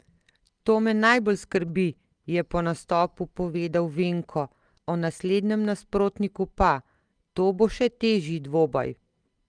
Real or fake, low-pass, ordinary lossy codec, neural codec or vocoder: real; 9.9 kHz; Opus, 24 kbps; none